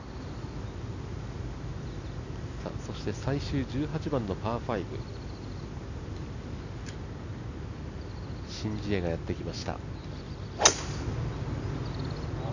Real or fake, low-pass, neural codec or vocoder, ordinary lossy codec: real; 7.2 kHz; none; none